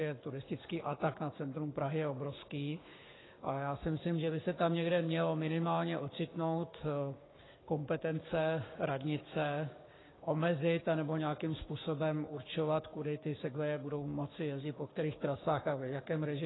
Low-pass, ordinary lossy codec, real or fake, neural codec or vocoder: 7.2 kHz; AAC, 16 kbps; fake; codec, 16 kHz, 6 kbps, DAC